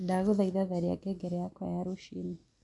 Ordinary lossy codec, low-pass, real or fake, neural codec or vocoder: none; 10.8 kHz; real; none